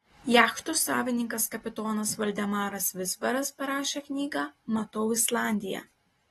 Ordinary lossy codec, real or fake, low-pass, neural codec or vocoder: AAC, 32 kbps; real; 14.4 kHz; none